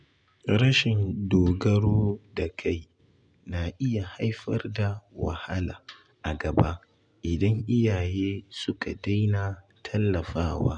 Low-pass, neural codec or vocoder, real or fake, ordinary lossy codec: 9.9 kHz; none; real; none